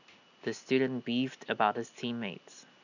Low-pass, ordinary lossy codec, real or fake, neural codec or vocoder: 7.2 kHz; none; real; none